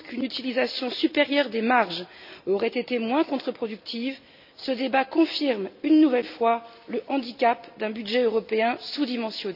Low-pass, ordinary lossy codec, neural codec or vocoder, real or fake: 5.4 kHz; none; none; real